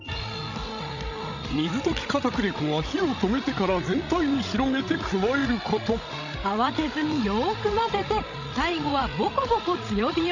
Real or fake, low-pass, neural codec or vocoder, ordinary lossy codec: fake; 7.2 kHz; codec, 16 kHz, 16 kbps, FreqCodec, larger model; none